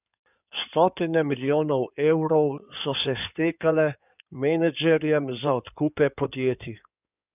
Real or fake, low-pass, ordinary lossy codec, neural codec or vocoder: fake; 3.6 kHz; none; codec, 16 kHz in and 24 kHz out, 2.2 kbps, FireRedTTS-2 codec